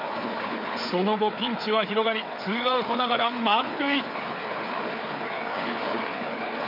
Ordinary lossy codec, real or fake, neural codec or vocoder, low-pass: MP3, 48 kbps; fake; codec, 16 kHz, 4 kbps, FreqCodec, larger model; 5.4 kHz